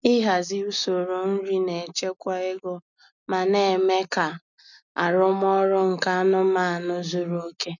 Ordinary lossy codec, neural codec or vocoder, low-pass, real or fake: none; none; 7.2 kHz; real